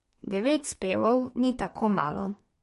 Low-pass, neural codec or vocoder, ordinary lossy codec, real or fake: 14.4 kHz; codec, 32 kHz, 1.9 kbps, SNAC; MP3, 48 kbps; fake